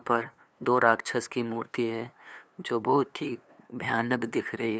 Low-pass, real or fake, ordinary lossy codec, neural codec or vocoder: none; fake; none; codec, 16 kHz, 2 kbps, FunCodec, trained on LibriTTS, 25 frames a second